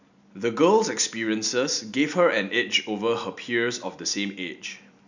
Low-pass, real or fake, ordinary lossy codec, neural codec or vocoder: 7.2 kHz; real; none; none